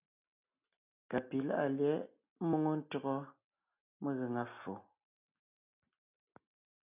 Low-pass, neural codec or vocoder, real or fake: 3.6 kHz; none; real